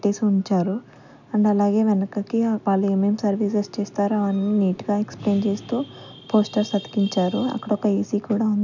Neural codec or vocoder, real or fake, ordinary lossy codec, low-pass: none; real; none; 7.2 kHz